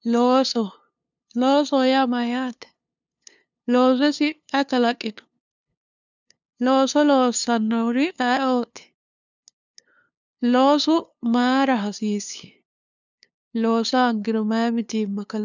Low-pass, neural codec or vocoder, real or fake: 7.2 kHz; codec, 16 kHz, 2 kbps, FunCodec, trained on LibriTTS, 25 frames a second; fake